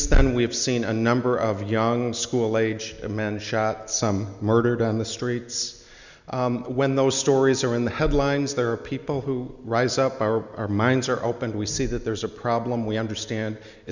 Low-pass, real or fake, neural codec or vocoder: 7.2 kHz; real; none